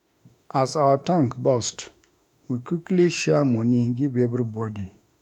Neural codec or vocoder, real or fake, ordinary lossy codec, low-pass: autoencoder, 48 kHz, 32 numbers a frame, DAC-VAE, trained on Japanese speech; fake; none; 19.8 kHz